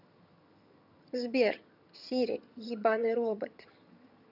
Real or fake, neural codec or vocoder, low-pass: fake; vocoder, 22.05 kHz, 80 mel bands, HiFi-GAN; 5.4 kHz